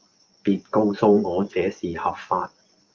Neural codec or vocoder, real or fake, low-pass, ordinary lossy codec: vocoder, 24 kHz, 100 mel bands, Vocos; fake; 7.2 kHz; Opus, 32 kbps